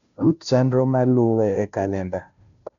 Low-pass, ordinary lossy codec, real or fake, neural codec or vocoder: 7.2 kHz; none; fake; codec, 16 kHz, 0.5 kbps, FunCodec, trained on Chinese and English, 25 frames a second